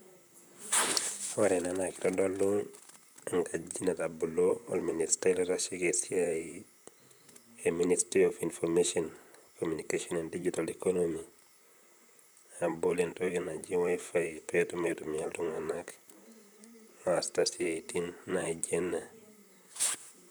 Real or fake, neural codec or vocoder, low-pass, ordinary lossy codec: fake; vocoder, 44.1 kHz, 128 mel bands, Pupu-Vocoder; none; none